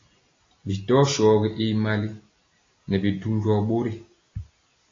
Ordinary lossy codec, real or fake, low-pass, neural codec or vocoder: AAC, 32 kbps; real; 7.2 kHz; none